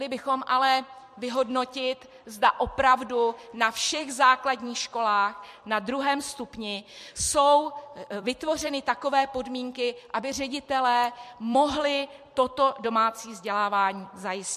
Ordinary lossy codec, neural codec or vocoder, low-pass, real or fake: MP3, 64 kbps; vocoder, 44.1 kHz, 128 mel bands every 256 samples, BigVGAN v2; 14.4 kHz; fake